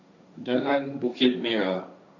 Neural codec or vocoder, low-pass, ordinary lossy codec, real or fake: codec, 16 kHz, 1.1 kbps, Voila-Tokenizer; 7.2 kHz; none; fake